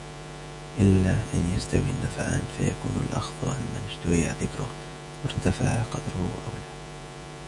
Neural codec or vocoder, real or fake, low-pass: vocoder, 48 kHz, 128 mel bands, Vocos; fake; 10.8 kHz